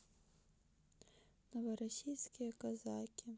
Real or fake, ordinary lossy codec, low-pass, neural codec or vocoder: real; none; none; none